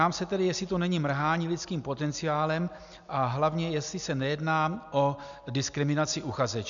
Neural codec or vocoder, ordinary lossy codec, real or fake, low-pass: none; MP3, 96 kbps; real; 7.2 kHz